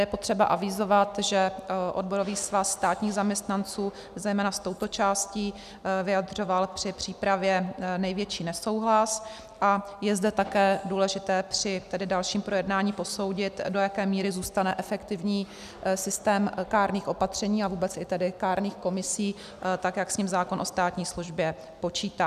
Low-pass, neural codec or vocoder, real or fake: 14.4 kHz; none; real